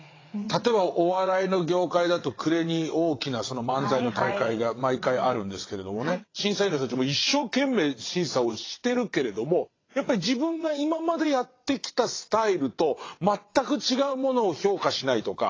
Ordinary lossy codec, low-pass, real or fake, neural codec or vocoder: AAC, 32 kbps; 7.2 kHz; fake; vocoder, 22.05 kHz, 80 mel bands, WaveNeXt